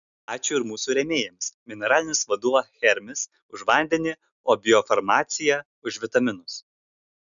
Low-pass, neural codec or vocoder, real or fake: 7.2 kHz; none; real